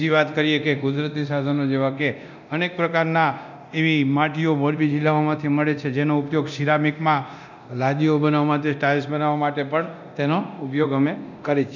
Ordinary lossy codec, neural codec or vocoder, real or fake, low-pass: none; codec, 24 kHz, 0.9 kbps, DualCodec; fake; 7.2 kHz